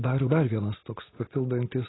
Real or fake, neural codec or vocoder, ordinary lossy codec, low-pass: real; none; AAC, 16 kbps; 7.2 kHz